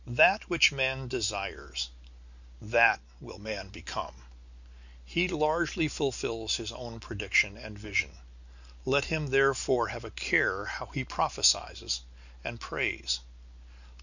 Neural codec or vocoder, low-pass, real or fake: none; 7.2 kHz; real